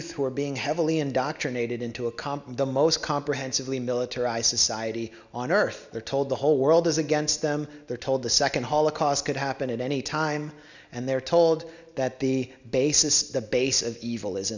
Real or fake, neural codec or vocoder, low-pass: real; none; 7.2 kHz